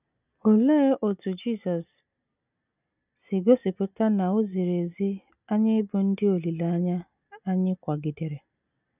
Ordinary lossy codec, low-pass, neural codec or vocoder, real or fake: none; 3.6 kHz; none; real